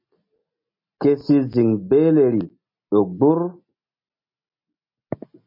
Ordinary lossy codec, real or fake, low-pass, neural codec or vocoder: MP3, 32 kbps; real; 5.4 kHz; none